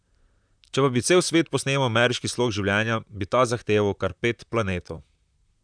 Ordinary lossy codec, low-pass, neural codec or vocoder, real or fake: none; 9.9 kHz; vocoder, 44.1 kHz, 128 mel bands, Pupu-Vocoder; fake